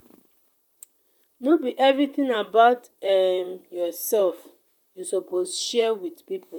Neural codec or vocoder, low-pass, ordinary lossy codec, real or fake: vocoder, 44.1 kHz, 128 mel bands, Pupu-Vocoder; 19.8 kHz; none; fake